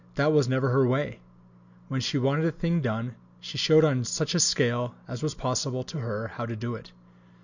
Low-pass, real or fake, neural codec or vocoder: 7.2 kHz; real; none